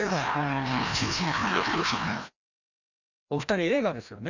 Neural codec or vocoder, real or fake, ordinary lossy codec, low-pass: codec, 16 kHz, 1 kbps, FreqCodec, larger model; fake; none; 7.2 kHz